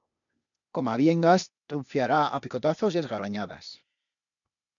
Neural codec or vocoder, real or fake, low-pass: codec, 16 kHz, 0.8 kbps, ZipCodec; fake; 7.2 kHz